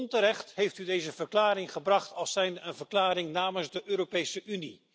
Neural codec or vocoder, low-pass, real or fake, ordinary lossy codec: none; none; real; none